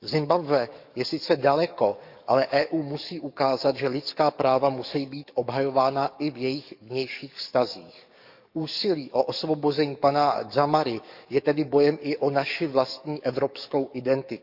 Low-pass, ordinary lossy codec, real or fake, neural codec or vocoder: 5.4 kHz; none; fake; codec, 44.1 kHz, 7.8 kbps, DAC